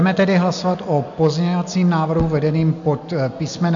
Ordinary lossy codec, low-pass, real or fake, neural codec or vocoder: MP3, 64 kbps; 7.2 kHz; real; none